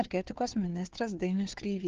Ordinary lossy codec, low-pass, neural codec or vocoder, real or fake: Opus, 16 kbps; 7.2 kHz; codec, 16 kHz, 2 kbps, FunCodec, trained on Chinese and English, 25 frames a second; fake